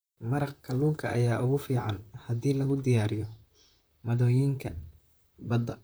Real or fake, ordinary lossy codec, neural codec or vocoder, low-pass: fake; none; vocoder, 44.1 kHz, 128 mel bands, Pupu-Vocoder; none